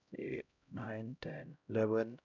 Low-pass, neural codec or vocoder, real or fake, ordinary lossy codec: 7.2 kHz; codec, 16 kHz, 0.5 kbps, X-Codec, HuBERT features, trained on LibriSpeech; fake; none